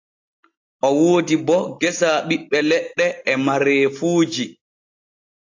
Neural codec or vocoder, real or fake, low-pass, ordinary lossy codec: none; real; 7.2 kHz; AAC, 48 kbps